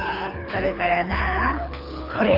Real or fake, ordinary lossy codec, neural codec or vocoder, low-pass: fake; none; codec, 24 kHz, 6 kbps, HILCodec; 5.4 kHz